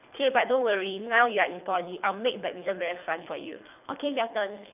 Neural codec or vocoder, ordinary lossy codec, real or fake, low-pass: codec, 24 kHz, 3 kbps, HILCodec; none; fake; 3.6 kHz